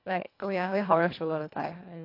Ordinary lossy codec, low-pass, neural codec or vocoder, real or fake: AAC, 32 kbps; 5.4 kHz; codec, 24 kHz, 1.5 kbps, HILCodec; fake